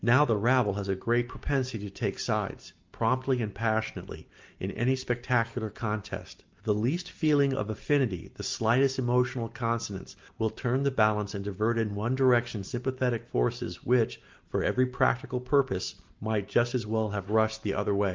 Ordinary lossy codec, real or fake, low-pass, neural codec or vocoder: Opus, 24 kbps; real; 7.2 kHz; none